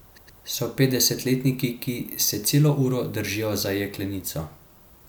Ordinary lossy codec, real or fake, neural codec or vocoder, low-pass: none; real; none; none